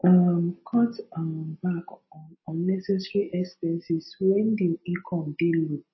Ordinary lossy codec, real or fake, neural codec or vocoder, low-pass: MP3, 24 kbps; real; none; 7.2 kHz